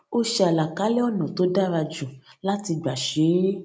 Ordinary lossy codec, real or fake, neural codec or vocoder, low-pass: none; real; none; none